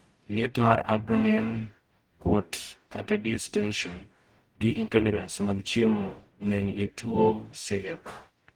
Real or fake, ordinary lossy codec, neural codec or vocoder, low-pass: fake; Opus, 24 kbps; codec, 44.1 kHz, 0.9 kbps, DAC; 19.8 kHz